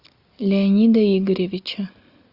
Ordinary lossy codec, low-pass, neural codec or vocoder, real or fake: Opus, 64 kbps; 5.4 kHz; none; real